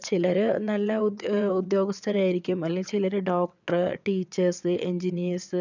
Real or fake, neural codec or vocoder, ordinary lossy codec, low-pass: fake; vocoder, 22.05 kHz, 80 mel bands, WaveNeXt; none; 7.2 kHz